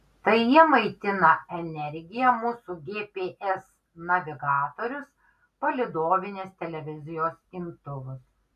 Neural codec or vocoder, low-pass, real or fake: none; 14.4 kHz; real